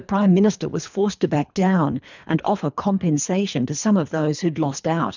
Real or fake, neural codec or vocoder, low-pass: fake; codec, 24 kHz, 3 kbps, HILCodec; 7.2 kHz